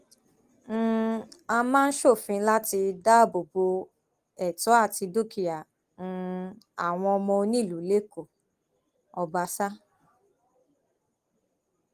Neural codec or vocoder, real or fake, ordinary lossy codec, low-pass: none; real; Opus, 24 kbps; 14.4 kHz